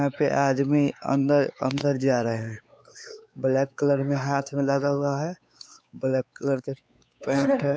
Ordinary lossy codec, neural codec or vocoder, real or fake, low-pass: none; codec, 16 kHz, 4 kbps, X-Codec, WavLM features, trained on Multilingual LibriSpeech; fake; none